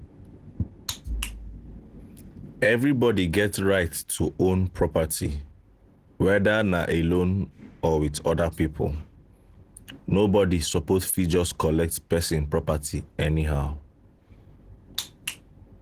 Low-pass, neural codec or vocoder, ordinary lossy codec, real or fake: 14.4 kHz; none; Opus, 16 kbps; real